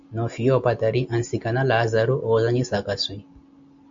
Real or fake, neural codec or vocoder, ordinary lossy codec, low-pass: real; none; MP3, 48 kbps; 7.2 kHz